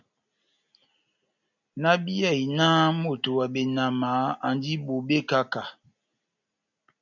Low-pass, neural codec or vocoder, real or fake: 7.2 kHz; none; real